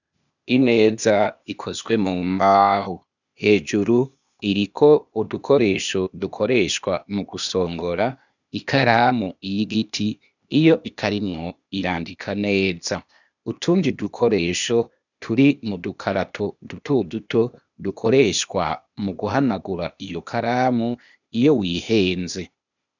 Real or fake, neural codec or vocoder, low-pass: fake; codec, 16 kHz, 0.8 kbps, ZipCodec; 7.2 kHz